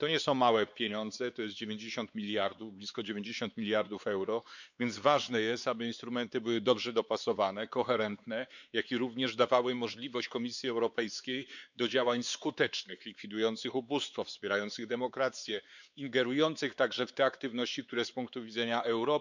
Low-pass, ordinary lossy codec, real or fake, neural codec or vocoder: 7.2 kHz; none; fake; codec, 16 kHz, 4 kbps, X-Codec, WavLM features, trained on Multilingual LibriSpeech